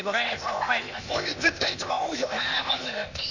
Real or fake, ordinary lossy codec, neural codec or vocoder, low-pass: fake; none; codec, 16 kHz, 0.8 kbps, ZipCodec; 7.2 kHz